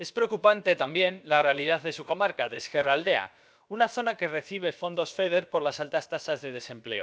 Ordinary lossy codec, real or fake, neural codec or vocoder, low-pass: none; fake; codec, 16 kHz, about 1 kbps, DyCAST, with the encoder's durations; none